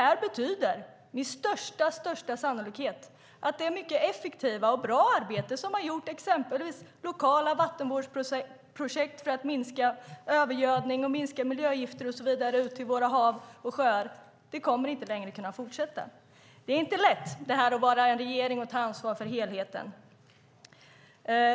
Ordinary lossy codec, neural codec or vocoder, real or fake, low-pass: none; none; real; none